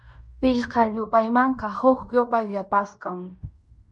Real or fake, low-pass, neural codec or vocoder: fake; 10.8 kHz; codec, 16 kHz in and 24 kHz out, 0.9 kbps, LongCat-Audio-Codec, fine tuned four codebook decoder